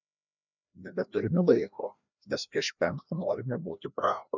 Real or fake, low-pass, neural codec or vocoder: fake; 7.2 kHz; codec, 16 kHz, 1 kbps, FreqCodec, larger model